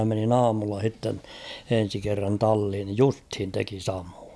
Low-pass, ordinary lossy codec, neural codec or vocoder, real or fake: none; none; none; real